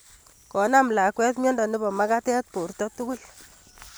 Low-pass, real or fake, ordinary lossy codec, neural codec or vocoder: none; real; none; none